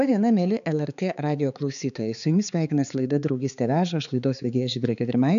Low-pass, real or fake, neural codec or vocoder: 7.2 kHz; fake; codec, 16 kHz, 4 kbps, X-Codec, HuBERT features, trained on balanced general audio